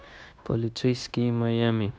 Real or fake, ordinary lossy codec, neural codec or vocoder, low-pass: fake; none; codec, 16 kHz, 0.9 kbps, LongCat-Audio-Codec; none